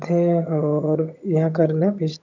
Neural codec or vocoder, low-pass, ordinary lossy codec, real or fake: vocoder, 22.05 kHz, 80 mel bands, HiFi-GAN; 7.2 kHz; none; fake